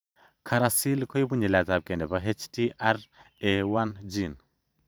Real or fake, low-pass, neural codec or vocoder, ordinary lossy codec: fake; none; vocoder, 44.1 kHz, 128 mel bands every 512 samples, BigVGAN v2; none